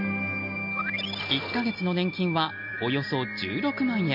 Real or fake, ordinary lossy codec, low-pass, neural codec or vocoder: real; none; 5.4 kHz; none